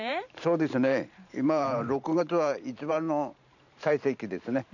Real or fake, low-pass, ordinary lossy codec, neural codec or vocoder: fake; 7.2 kHz; none; vocoder, 44.1 kHz, 128 mel bands every 512 samples, BigVGAN v2